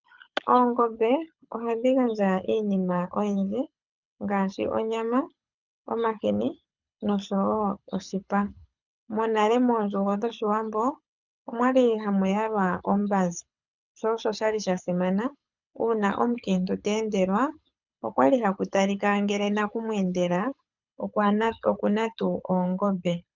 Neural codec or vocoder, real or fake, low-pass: codec, 24 kHz, 6 kbps, HILCodec; fake; 7.2 kHz